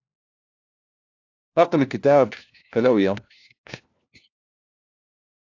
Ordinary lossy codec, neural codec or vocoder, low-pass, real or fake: AAC, 48 kbps; codec, 16 kHz, 1 kbps, FunCodec, trained on LibriTTS, 50 frames a second; 7.2 kHz; fake